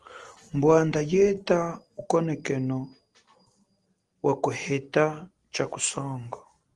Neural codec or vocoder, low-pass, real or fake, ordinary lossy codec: none; 10.8 kHz; real; Opus, 24 kbps